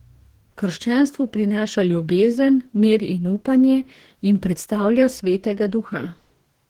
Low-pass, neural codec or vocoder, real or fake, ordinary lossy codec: 19.8 kHz; codec, 44.1 kHz, 2.6 kbps, DAC; fake; Opus, 16 kbps